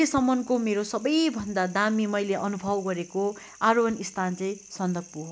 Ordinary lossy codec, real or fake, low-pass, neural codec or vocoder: none; real; none; none